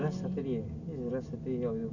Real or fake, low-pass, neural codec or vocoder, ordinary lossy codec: real; 7.2 kHz; none; none